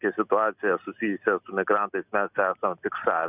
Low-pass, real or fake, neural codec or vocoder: 3.6 kHz; real; none